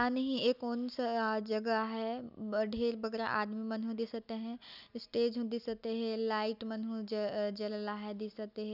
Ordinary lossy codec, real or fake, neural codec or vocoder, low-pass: none; real; none; 5.4 kHz